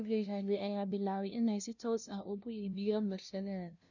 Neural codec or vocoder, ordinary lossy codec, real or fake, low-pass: codec, 16 kHz, 0.5 kbps, FunCodec, trained on LibriTTS, 25 frames a second; none; fake; 7.2 kHz